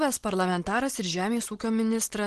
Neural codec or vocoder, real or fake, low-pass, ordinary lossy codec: none; real; 10.8 kHz; Opus, 16 kbps